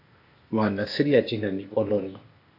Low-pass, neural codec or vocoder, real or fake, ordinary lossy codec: 5.4 kHz; codec, 16 kHz, 0.8 kbps, ZipCodec; fake; AAC, 32 kbps